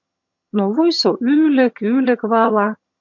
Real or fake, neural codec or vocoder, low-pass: fake; vocoder, 22.05 kHz, 80 mel bands, HiFi-GAN; 7.2 kHz